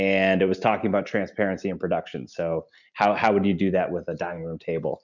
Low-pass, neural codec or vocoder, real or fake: 7.2 kHz; none; real